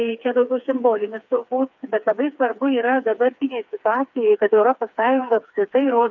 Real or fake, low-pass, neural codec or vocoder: fake; 7.2 kHz; codec, 16 kHz, 4 kbps, FreqCodec, smaller model